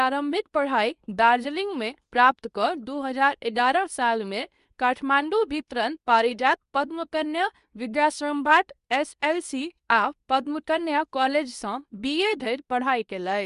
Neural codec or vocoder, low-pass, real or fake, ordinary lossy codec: codec, 24 kHz, 0.9 kbps, WavTokenizer, medium speech release version 2; 10.8 kHz; fake; Opus, 64 kbps